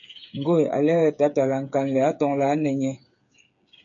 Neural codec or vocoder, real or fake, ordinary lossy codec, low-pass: codec, 16 kHz, 8 kbps, FreqCodec, smaller model; fake; MP3, 64 kbps; 7.2 kHz